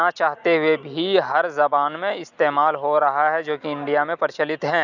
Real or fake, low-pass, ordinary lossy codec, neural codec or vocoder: real; 7.2 kHz; none; none